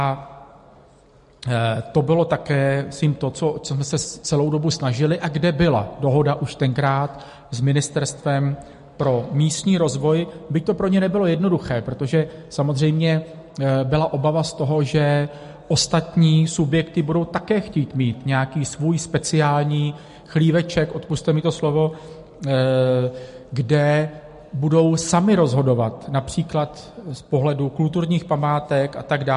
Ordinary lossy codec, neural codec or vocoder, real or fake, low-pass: MP3, 48 kbps; none; real; 10.8 kHz